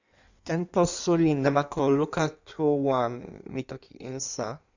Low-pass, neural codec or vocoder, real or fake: 7.2 kHz; codec, 16 kHz in and 24 kHz out, 1.1 kbps, FireRedTTS-2 codec; fake